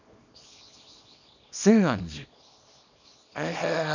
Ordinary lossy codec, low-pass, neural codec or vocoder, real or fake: none; 7.2 kHz; codec, 24 kHz, 0.9 kbps, WavTokenizer, small release; fake